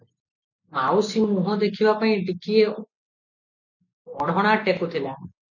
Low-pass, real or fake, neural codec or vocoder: 7.2 kHz; real; none